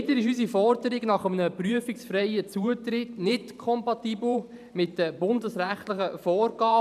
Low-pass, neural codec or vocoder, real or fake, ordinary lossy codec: 14.4 kHz; vocoder, 44.1 kHz, 128 mel bands every 512 samples, BigVGAN v2; fake; none